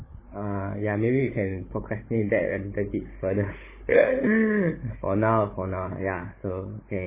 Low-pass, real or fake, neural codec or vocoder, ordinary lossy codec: 3.6 kHz; fake; codec, 16 kHz, 16 kbps, FunCodec, trained on Chinese and English, 50 frames a second; MP3, 16 kbps